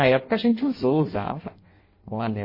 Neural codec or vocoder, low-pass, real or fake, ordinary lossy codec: codec, 16 kHz in and 24 kHz out, 0.6 kbps, FireRedTTS-2 codec; 5.4 kHz; fake; MP3, 24 kbps